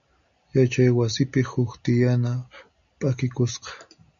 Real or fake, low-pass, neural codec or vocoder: real; 7.2 kHz; none